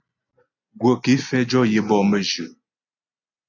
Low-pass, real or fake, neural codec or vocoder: 7.2 kHz; real; none